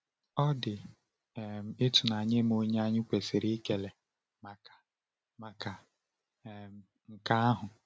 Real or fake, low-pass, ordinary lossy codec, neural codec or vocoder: real; none; none; none